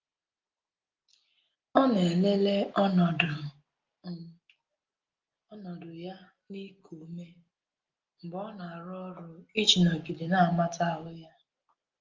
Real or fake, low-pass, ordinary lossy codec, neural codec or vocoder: real; 7.2 kHz; Opus, 32 kbps; none